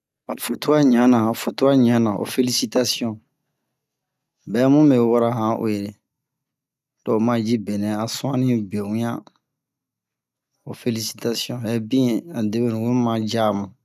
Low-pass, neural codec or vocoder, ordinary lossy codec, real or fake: 14.4 kHz; none; none; real